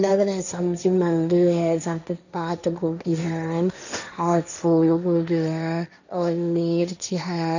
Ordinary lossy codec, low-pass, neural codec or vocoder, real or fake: none; 7.2 kHz; codec, 16 kHz, 1.1 kbps, Voila-Tokenizer; fake